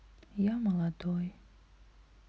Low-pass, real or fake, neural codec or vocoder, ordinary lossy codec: none; real; none; none